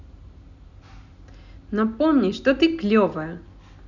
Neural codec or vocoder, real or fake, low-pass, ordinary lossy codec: none; real; 7.2 kHz; none